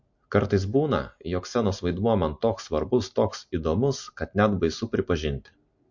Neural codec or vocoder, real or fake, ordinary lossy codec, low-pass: none; real; MP3, 48 kbps; 7.2 kHz